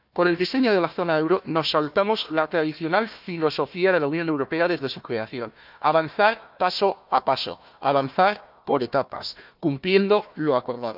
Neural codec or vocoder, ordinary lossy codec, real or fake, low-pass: codec, 16 kHz, 1 kbps, FunCodec, trained on Chinese and English, 50 frames a second; none; fake; 5.4 kHz